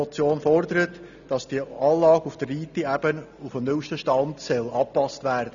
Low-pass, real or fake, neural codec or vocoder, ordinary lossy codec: 7.2 kHz; real; none; none